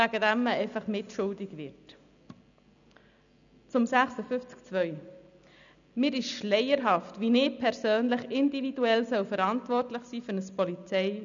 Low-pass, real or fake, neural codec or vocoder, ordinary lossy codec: 7.2 kHz; real; none; none